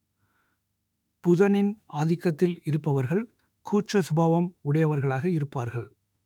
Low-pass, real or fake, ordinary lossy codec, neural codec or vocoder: 19.8 kHz; fake; none; autoencoder, 48 kHz, 32 numbers a frame, DAC-VAE, trained on Japanese speech